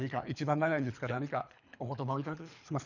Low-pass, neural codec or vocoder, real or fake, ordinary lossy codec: 7.2 kHz; codec, 24 kHz, 3 kbps, HILCodec; fake; none